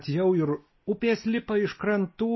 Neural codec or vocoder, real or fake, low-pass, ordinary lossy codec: none; real; 7.2 kHz; MP3, 24 kbps